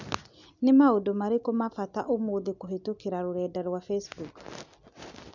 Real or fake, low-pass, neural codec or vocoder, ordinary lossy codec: real; 7.2 kHz; none; none